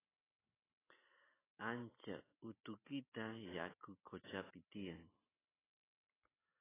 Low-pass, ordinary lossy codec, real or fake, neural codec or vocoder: 3.6 kHz; AAC, 16 kbps; real; none